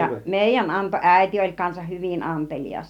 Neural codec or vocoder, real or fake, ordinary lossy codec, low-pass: none; real; none; 19.8 kHz